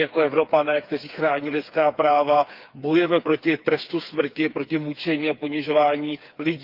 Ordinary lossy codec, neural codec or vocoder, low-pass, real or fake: Opus, 24 kbps; codec, 16 kHz, 4 kbps, FreqCodec, smaller model; 5.4 kHz; fake